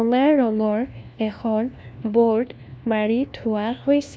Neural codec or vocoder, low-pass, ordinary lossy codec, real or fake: codec, 16 kHz, 1 kbps, FunCodec, trained on LibriTTS, 50 frames a second; none; none; fake